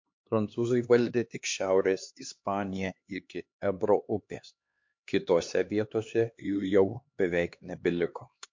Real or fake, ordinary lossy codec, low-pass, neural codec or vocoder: fake; MP3, 48 kbps; 7.2 kHz; codec, 16 kHz, 2 kbps, X-Codec, HuBERT features, trained on LibriSpeech